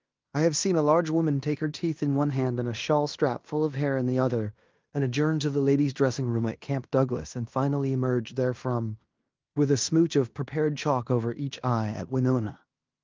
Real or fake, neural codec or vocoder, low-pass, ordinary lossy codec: fake; codec, 16 kHz in and 24 kHz out, 0.9 kbps, LongCat-Audio-Codec, fine tuned four codebook decoder; 7.2 kHz; Opus, 24 kbps